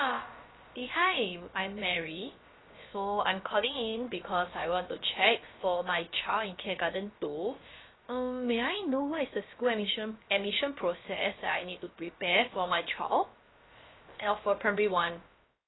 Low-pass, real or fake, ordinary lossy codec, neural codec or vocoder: 7.2 kHz; fake; AAC, 16 kbps; codec, 16 kHz, about 1 kbps, DyCAST, with the encoder's durations